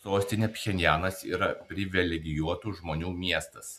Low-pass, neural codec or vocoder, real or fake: 14.4 kHz; none; real